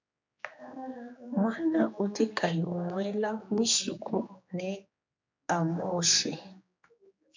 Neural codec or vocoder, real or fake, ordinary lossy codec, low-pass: codec, 16 kHz, 2 kbps, X-Codec, HuBERT features, trained on general audio; fake; MP3, 64 kbps; 7.2 kHz